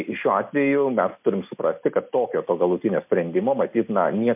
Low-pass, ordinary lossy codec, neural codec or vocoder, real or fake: 3.6 kHz; MP3, 32 kbps; none; real